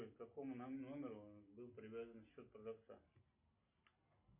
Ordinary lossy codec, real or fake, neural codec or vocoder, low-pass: MP3, 32 kbps; real; none; 3.6 kHz